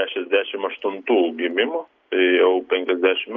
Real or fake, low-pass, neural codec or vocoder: real; 7.2 kHz; none